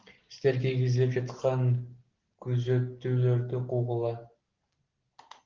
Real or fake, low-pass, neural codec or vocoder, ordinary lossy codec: real; 7.2 kHz; none; Opus, 16 kbps